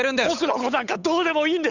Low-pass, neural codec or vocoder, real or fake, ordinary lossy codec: 7.2 kHz; codec, 16 kHz, 8 kbps, FunCodec, trained on Chinese and English, 25 frames a second; fake; none